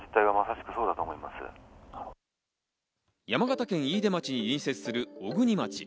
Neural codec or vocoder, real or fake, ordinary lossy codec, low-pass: none; real; none; none